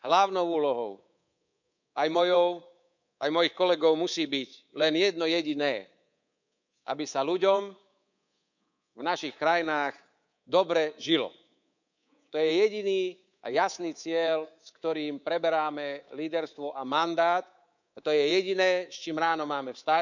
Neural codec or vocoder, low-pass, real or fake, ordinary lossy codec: codec, 24 kHz, 3.1 kbps, DualCodec; 7.2 kHz; fake; none